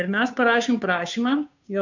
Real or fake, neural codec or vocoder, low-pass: fake; codec, 16 kHz, 2 kbps, FunCodec, trained on Chinese and English, 25 frames a second; 7.2 kHz